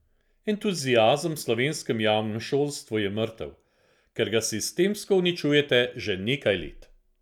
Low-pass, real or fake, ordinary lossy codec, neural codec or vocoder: 19.8 kHz; real; none; none